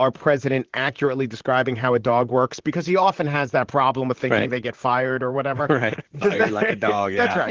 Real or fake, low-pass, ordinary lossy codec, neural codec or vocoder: fake; 7.2 kHz; Opus, 16 kbps; vocoder, 44.1 kHz, 128 mel bands, Pupu-Vocoder